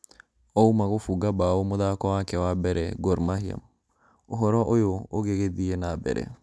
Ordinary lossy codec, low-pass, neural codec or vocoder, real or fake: none; none; none; real